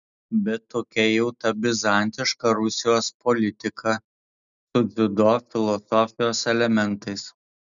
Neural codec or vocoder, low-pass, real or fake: none; 7.2 kHz; real